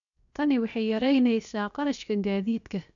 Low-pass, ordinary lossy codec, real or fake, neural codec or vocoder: 7.2 kHz; none; fake; codec, 16 kHz, 0.7 kbps, FocalCodec